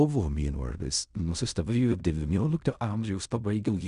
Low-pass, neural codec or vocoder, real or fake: 10.8 kHz; codec, 16 kHz in and 24 kHz out, 0.4 kbps, LongCat-Audio-Codec, fine tuned four codebook decoder; fake